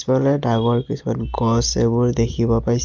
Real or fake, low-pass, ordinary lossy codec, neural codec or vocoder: real; 7.2 kHz; Opus, 24 kbps; none